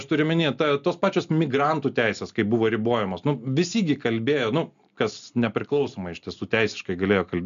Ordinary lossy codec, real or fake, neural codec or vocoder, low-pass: MP3, 96 kbps; real; none; 7.2 kHz